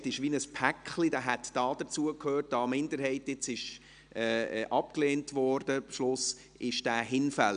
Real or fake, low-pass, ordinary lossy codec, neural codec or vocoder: real; 9.9 kHz; none; none